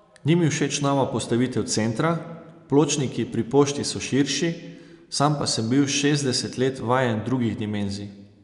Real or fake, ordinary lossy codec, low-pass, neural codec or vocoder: real; none; 10.8 kHz; none